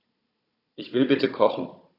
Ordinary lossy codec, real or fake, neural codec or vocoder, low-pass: AAC, 32 kbps; fake; codec, 16 kHz, 16 kbps, FunCodec, trained on Chinese and English, 50 frames a second; 5.4 kHz